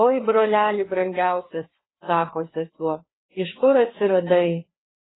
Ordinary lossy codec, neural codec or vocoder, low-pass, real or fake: AAC, 16 kbps; codec, 16 kHz, 2 kbps, FreqCodec, larger model; 7.2 kHz; fake